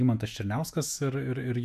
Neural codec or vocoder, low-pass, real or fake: autoencoder, 48 kHz, 128 numbers a frame, DAC-VAE, trained on Japanese speech; 14.4 kHz; fake